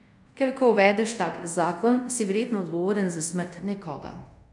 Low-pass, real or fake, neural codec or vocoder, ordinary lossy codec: 10.8 kHz; fake; codec, 24 kHz, 0.5 kbps, DualCodec; MP3, 96 kbps